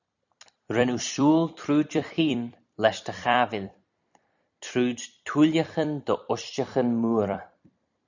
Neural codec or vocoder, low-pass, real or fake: vocoder, 44.1 kHz, 128 mel bands every 256 samples, BigVGAN v2; 7.2 kHz; fake